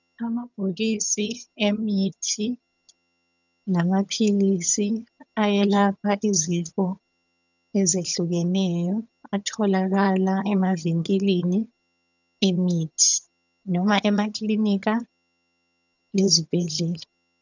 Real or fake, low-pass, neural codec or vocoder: fake; 7.2 kHz; vocoder, 22.05 kHz, 80 mel bands, HiFi-GAN